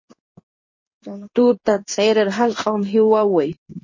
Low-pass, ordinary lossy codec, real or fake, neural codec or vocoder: 7.2 kHz; MP3, 32 kbps; fake; codec, 16 kHz in and 24 kHz out, 1 kbps, XY-Tokenizer